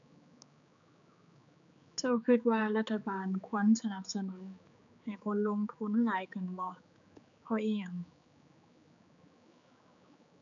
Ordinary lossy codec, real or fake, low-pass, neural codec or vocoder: none; fake; 7.2 kHz; codec, 16 kHz, 4 kbps, X-Codec, HuBERT features, trained on general audio